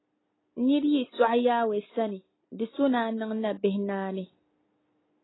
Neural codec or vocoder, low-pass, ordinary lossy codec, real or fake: none; 7.2 kHz; AAC, 16 kbps; real